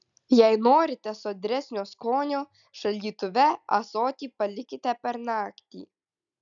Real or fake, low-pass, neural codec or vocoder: real; 7.2 kHz; none